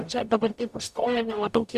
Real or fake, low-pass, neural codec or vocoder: fake; 14.4 kHz; codec, 44.1 kHz, 0.9 kbps, DAC